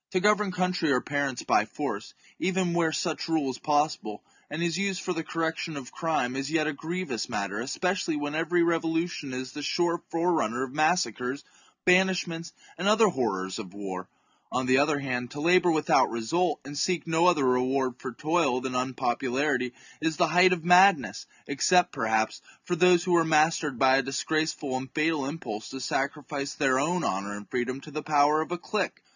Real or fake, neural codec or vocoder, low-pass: real; none; 7.2 kHz